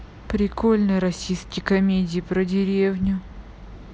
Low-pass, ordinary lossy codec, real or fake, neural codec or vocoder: none; none; real; none